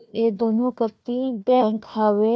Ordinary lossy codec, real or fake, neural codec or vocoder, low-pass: none; fake; codec, 16 kHz, 1 kbps, FunCodec, trained on Chinese and English, 50 frames a second; none